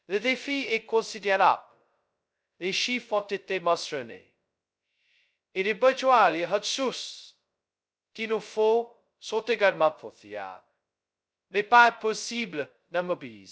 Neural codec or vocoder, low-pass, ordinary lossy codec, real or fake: codec, 16 kHz, 0.2 kbps, FocalCodec; none; none; fake